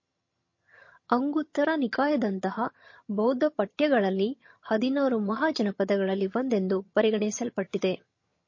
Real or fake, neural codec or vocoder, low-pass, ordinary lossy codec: fake; vocoder, 22.05 kHz, 80 mel bands, HiFi-GAN; 7.2 kHz; MP3, 32 kbps